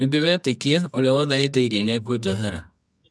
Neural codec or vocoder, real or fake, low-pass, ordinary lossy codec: codec, 24 kHz, 0.9 kbps, WavTokenizer, medium music audio release; fake; none; none